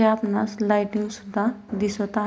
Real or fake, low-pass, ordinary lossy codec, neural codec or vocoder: real; none; none; none